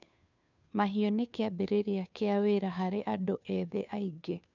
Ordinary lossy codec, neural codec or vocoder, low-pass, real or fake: none; codec, 16 kHz, 2 kbps, FunCodec, trained on Chinese and English, 25 frames a second; 7.2 kHz; fake